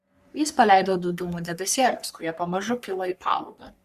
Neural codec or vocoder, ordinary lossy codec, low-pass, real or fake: codec, 44.1 kHz, 3.4 kbps, Pupu-Codec; Opus, 64 kbps; 14.4 kHz; fake